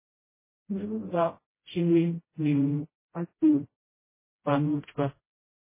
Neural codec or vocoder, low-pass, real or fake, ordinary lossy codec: codec, 16 kHz, 0.5 kbps, FreqCodec, smaller model; 3.6 kHz; fake; MP3, 16 kbps